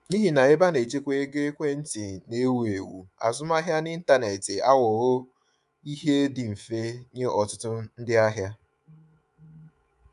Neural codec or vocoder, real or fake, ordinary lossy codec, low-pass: codec, 24 kHz, 3.1 kbps, DualCodec; fake; none; 10.8 kHz